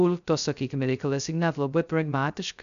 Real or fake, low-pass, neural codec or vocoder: fake; 7.2 kHz; codec, 16 kHz, 0.2 kbps, FocalCodec